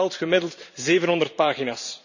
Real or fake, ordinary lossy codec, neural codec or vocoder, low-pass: fake; none; vocoder, 44.1 kHz, 128 mel bands every 512 samples, BigVGAN v2; 7.2 kHz